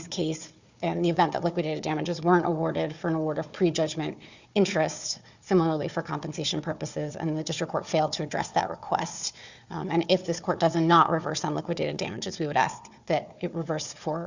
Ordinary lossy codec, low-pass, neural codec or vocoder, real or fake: Opus, 64 kbps; 7.2 kHz; codec, 16 kHz, 4 kbps, FunCodec, trained on Chinese and English, 50 frames a second; fake